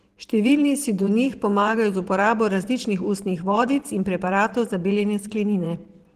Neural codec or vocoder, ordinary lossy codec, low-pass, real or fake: vocoder, 44.1 kHz, 128 mel bands every 512 samples, BigVGAN v2; Opus, 16 kbps; 14.4 kHz; fake